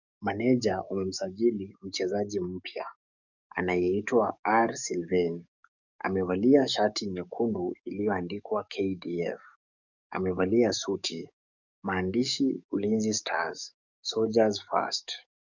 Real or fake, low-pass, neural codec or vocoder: fake; 7.2 kHz; codec, 44.1 kHz, 7.8 kbps, DAC